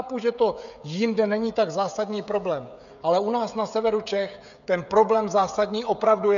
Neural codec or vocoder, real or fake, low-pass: codec, 16 kHz, 16 kbps, FreqCodec, smaller model; fake; 7.2 kHz